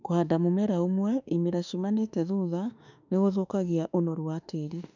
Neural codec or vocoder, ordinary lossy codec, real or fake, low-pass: autoencoder, 48 kHz, 32 numbers a frame, DAC-VAE, trained on Japanese speech; none; fake; 7.2 kHz